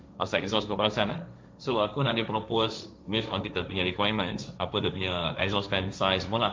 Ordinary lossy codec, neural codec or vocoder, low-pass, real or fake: none; codec, 16 kHz, 1.1 kbps, Voila-Tokenizer; none; fake